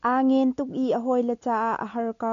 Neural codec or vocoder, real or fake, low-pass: none; real; 7.2 kHz